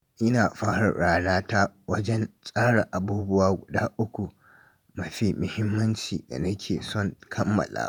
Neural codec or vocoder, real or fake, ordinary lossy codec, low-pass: vocoder, 48 kHz, 128 mel bands, Vocos; fake; none; none